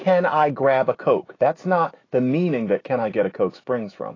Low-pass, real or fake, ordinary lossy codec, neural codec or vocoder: 7.2 kHz; fake; AAC, 32 kbps; autoencoder, 48 kHz, 128 numbers a frame, DAC-VAE, trained on Japanese speech